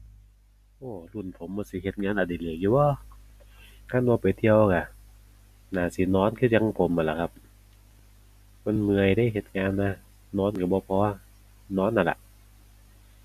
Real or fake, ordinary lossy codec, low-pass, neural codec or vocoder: real; none; 14.4 kHz; none